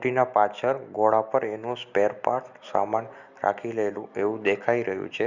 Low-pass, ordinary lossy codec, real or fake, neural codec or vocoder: 7.2 kHz; Opus, 64 kbps; real; none